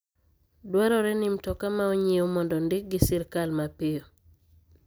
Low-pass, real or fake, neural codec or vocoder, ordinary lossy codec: none; real; none; none